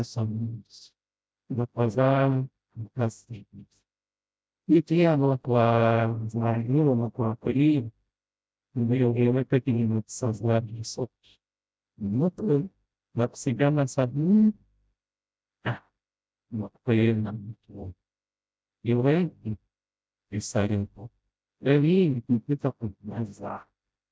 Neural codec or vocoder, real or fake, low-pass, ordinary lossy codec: codec, 16 kHz, 0.5 kbps, FreqCodec, smaller model; fake; none; none